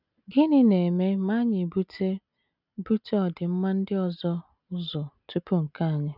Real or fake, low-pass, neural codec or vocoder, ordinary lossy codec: real; 5.4 kHz; none; none